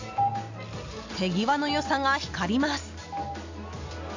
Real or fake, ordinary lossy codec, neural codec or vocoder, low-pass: real; AAC, 48 kbps; none; 7.2 kHz